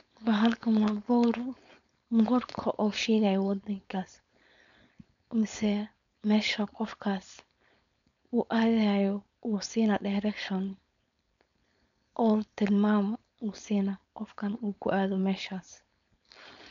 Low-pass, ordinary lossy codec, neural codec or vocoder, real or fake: 7.2 kHz; none; codec, 16 kHz, 4.8 kbps, FACodec; fake